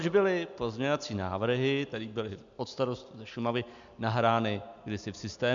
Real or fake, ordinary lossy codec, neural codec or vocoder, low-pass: real; MP3, 64 kbps; none; 7.2 kHz